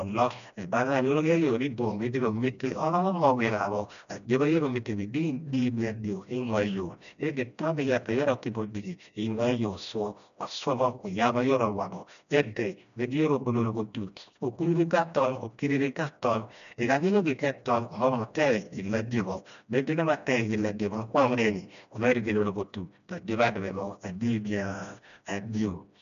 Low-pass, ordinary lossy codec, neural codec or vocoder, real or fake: 7.2 kHz; none; codec, 16 kHz, 1 kbps, FreqCodec, smaller model; fake